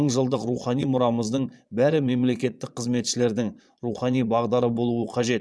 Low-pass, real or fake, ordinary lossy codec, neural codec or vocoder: none; fake; none; vocoder, 22.05 kHz, 80 mel bands, Vocos